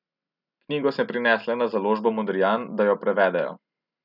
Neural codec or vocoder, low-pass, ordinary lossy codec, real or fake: none; 5.4 kHz; none; real